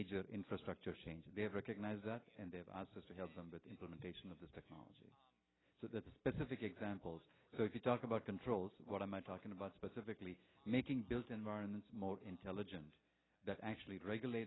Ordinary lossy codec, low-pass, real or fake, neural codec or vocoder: AAC, 16 kbps; 7.2 kHz; real; none